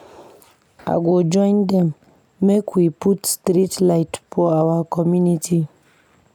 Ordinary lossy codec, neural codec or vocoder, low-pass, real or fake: none; none; 19.8 kHz; real